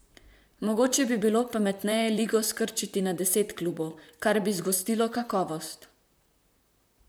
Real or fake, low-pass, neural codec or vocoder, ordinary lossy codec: fake; none; vocoder, 44.1 kHz, 128 mel bands, Pupu-Vocoder; none